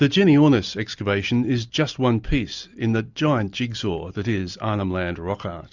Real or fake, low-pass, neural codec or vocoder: real; 7.2 kHz; none